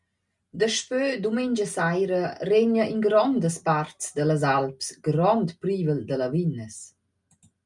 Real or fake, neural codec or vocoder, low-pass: real; none; 10.8 kHz